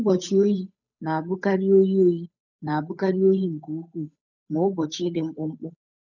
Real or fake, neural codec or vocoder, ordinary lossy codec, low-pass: fake; codec, 16 kHz, 8 kbps, FunCodec, trained on Chinese and English, 25 frames a second; none; 7.2 kHz